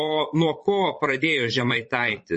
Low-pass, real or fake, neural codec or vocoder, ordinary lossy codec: 9.9 kHz; fake; vocoder, 22.05 kHz, 80 mel bands, Vocos; MP3, 32 kbps